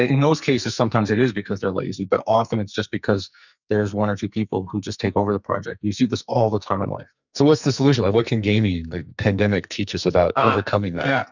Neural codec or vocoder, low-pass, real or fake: codec, 44.1 kHz, 2.6 kbps, SNAC; 7.2 kHz; fake